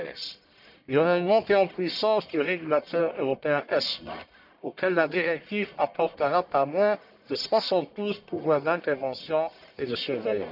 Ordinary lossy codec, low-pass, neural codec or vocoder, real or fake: none; 5.4 kHz; codec, 44.1 kHz, 1.7 kbps, Pupu-Codec; fake